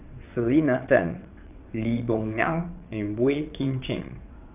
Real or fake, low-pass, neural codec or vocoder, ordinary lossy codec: fake; 3.6 kHz; vocoder, 22.05 kHz, 80 mel bands, WaveNeXt; none